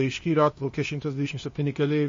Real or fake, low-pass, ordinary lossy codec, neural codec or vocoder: fake; 7.2 kHz; MP3, 32 kbps; codec, 16 kHz, 0.8 kbps, ZipCodec